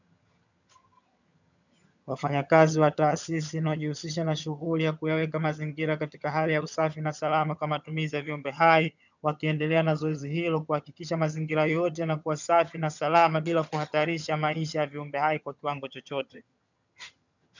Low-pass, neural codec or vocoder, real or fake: 7.2 kHz; vocoder, 22.05 kHz, 80 mel bands, HiFi-GAN; fake